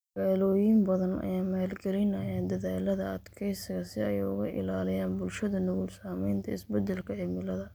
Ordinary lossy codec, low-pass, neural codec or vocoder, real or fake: none; none; none; real